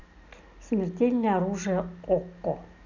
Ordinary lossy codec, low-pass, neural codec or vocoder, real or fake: none; 7.2 kHz; none; real